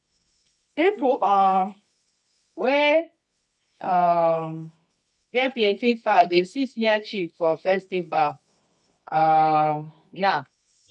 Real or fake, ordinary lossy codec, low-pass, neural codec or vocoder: fake; none; 10.8 kHz; codec, 24 kHz, 0.9 kbps, WavTokenizer, medium music audio release